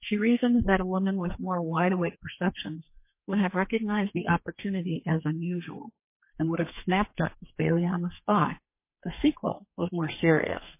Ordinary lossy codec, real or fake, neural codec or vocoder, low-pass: MP3, 24 kbps; fake; codec, 32 kHz, 1.9 kbps, SNAC; 3.6 kHz